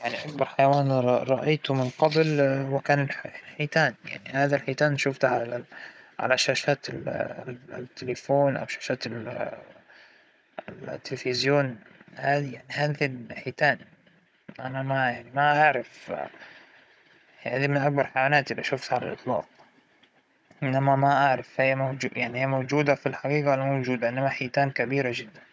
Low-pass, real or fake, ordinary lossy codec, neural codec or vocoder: none; fake; none; codec, 16 kHz, 16 kbps, FunCodec, trained on Chinese and English, 50 frames a second